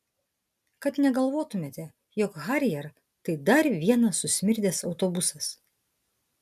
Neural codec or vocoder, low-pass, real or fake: none; 14.4 kHz; real